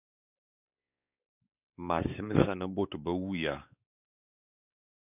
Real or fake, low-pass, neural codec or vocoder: fake; 3.6 kHz; codec, 16 kHz, 4 kbps, X-Codec, WavLM features, trained on Multilingual LibriSpeech